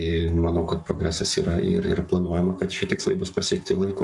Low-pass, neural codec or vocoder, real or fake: 10.8 kHz; codec, 44.1 kHz, 7.8 kbps, Pupu-Codec; fake